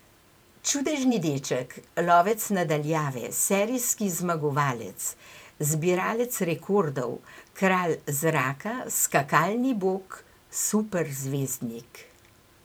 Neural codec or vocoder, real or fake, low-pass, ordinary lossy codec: vocoder, 44.1 kHz, 128 mel bands every 512 samples, BigVGAN v2; fake; none; none